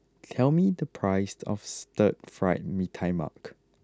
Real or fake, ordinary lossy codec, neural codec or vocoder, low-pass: real; none; none; none